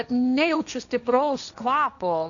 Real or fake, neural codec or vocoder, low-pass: fake; codec, 16 kHz, 1.1 kbps, Voila-Tokenizer; 7.2 kHz